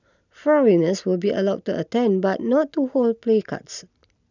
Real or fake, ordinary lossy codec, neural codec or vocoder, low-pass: real; none; none; 7.2 kHz